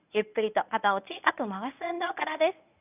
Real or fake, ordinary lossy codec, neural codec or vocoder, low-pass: fake; none; codec, 24 kHz, 0.9 kbps, WavTokenizer, medium speech release version 1; 3.6 kHz